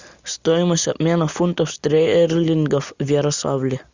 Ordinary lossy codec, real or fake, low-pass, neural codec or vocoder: Opus, 64 kbps; real; 7.2 kHz; none